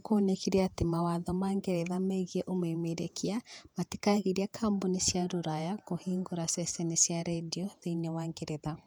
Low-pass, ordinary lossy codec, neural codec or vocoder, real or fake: 19.8 kHz; none; vocoder, 48 kHz, 128 mel bands, Vocos; fake